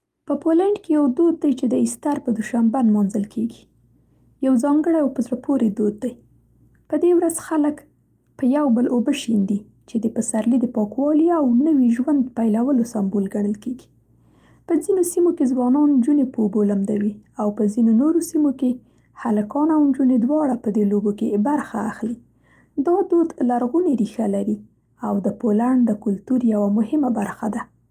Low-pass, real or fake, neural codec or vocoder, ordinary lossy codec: 14.4 kHz; real; none; Opus, 32 kbps